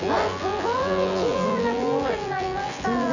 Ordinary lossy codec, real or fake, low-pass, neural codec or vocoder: none; fake; 7.2 kHz; vocoder, 24 kHz, 100 mel bands, Vocos